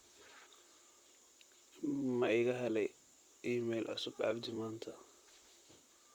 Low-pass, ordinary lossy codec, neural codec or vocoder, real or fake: 19.8 kHz; Opus, 64 kbps; vocoder, 44.1 kHz, 128 mel bands, Pupu-Vocoder; fake